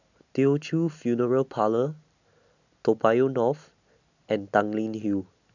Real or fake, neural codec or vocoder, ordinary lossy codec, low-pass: real; none; none; 7.2 kHz